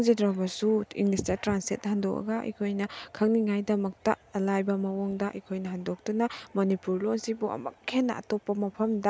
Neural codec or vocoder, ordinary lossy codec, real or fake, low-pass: none; none; real; none